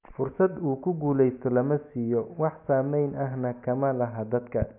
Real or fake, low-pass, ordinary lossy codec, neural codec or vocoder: real; 3.6 kHz; none; none